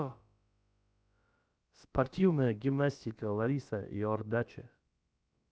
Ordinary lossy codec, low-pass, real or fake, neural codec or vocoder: none; none; fake; codec, 16 kHz, about 1 kbps, DyCAST, with the encoder's durations